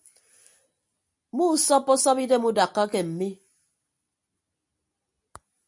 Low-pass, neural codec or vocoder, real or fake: 10.8 kHz; none; real